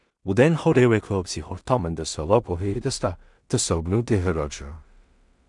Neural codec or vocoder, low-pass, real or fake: codec, 16 kHz in and 24 kHz out, 0.4 kbps, LongCat-Audio-Codec, two codebook decoder; 10.8 kHz; fake